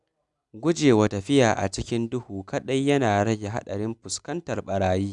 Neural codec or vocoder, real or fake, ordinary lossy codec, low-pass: none; real; none; 10.8 kHz